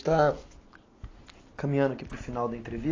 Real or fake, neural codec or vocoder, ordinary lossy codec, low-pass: real; none; AAC, 32 kbps; 7.2 kHz